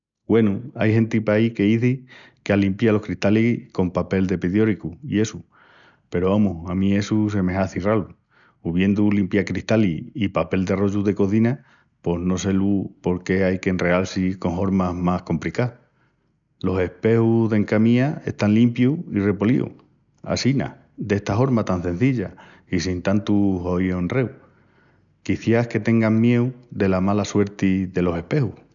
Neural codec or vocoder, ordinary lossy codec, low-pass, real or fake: none; none; 7.2 kHz; real